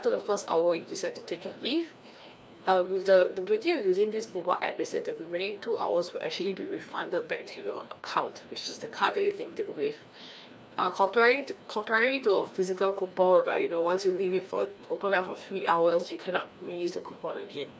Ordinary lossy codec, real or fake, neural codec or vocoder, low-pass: none; fake; codec, 16 kHz, 1 kbps, FreqCodec, larger model; none